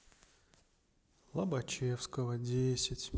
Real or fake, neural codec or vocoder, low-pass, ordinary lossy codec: real; none; none; none